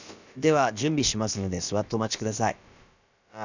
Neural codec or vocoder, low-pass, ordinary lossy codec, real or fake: codec, 16 kHz, about 1 kbps, DyCAST, with the encoder's durations; 7.2 kHz; none; fake